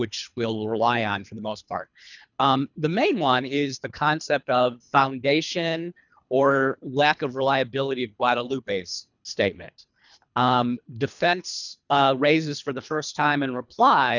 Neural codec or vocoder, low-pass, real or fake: codec, 24 kHz, 3 kbps, HILCodec; 7.2 kHz; fake